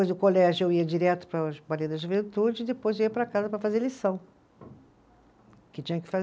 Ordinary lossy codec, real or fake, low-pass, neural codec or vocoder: none; real; none; none